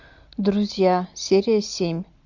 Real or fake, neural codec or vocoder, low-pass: real; none; 7.2 kHz